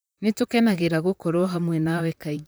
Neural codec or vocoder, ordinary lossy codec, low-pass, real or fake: vocoder, 44.1 kHz, 128 mel bands, Pupu-Vocoder; none; none; fake